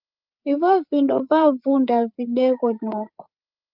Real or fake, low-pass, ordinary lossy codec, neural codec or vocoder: fake; 5.4 kHz; Opus, 24 kbps; codec, 16 kHz, 8 kbps, FreqCodec, larger model